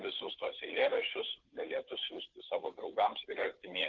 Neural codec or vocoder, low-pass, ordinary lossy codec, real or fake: codec, 16 kHz, 2 kbps, FunCodec, trained on Chinese and English, 25 frames a second; 7.2 kHz; Opus, 32 kbps; fake